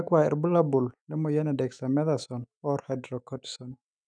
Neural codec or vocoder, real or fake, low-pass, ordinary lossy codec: autoencoder, 48 kHz, 128 numbers a frame, DAC-VAE, trained on Japanese speech; fake; 9.9 kHz; none